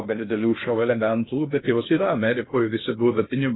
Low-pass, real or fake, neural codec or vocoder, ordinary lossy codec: 7.2 kHz; fake; codec, 16 kHz in and 24 kHz out, 0.6 kbps, FocalCodec, streaming, 2048 codes; AAC, 16 kbps